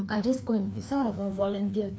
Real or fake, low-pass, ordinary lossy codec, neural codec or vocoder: fake; none; none; codec, 16 kHz, 2 kbps, FreqCodec, larger model